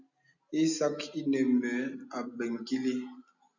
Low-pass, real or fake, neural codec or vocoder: 7.2 kHz; real; none